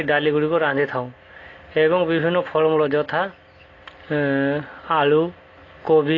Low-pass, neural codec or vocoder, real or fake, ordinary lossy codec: 7.2 kHz; none; real; AAC, 32 kbps